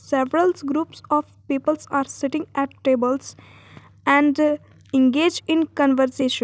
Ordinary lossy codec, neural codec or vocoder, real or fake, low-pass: none; none; real; none